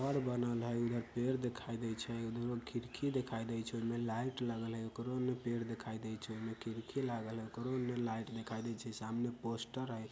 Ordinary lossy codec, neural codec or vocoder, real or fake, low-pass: none; none; real; none